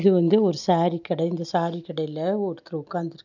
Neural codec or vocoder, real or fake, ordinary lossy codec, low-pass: none; real; none; 7.2 kHz